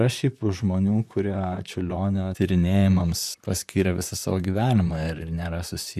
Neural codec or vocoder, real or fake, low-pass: vocoder, 44.1 kHz, 128 mel bands, Pupu-Vocoder; fake; 14.4 kHz